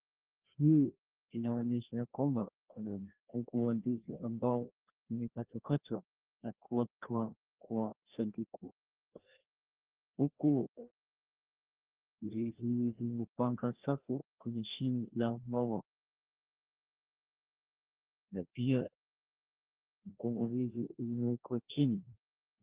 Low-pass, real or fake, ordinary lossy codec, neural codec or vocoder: 3.6 kHz; fake; Opus, 16 kbps; codec, 16 kHz, 1 kbps, FreqCodec, larger model